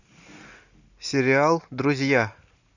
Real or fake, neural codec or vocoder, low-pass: real; none; 7.2 kHz